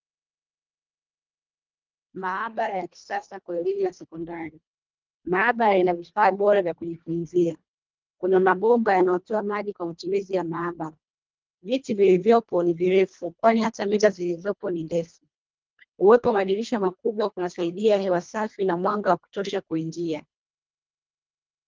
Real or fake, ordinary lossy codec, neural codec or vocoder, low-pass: fake; Opus, 24 kbps; codec, 24 kHz, 1.5 kbps, HILCodec; 7.2 kHz